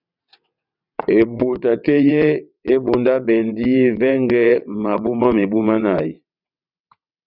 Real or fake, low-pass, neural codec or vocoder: fake; 5.4 kHz; vocoder, 22.05 kHz, 80 mel bands, WaveNeXt